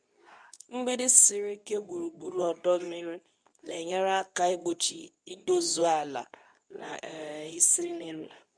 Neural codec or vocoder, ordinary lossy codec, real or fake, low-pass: codec, 24 kHz, 0.9 kbps, WavTokenizer, medium speech release version 2; none; fake; 9.9 kHz